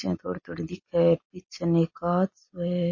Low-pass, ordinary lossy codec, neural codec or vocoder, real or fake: 7.2 kHz; MP3, 32 kbps; none; real